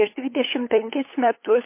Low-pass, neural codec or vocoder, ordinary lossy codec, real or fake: 3.6 kHz; codec, 16 kHz, 8 kbps, FunCodec, trained on LibriTTS, 25 frames a second; MP3, 24 kbps; fake